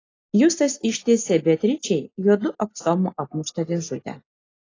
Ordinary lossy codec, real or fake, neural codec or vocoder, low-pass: AAC, 32 kbps; real; none; 7.2 kHz